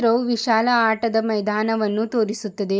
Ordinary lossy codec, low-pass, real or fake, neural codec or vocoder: none; none; real; none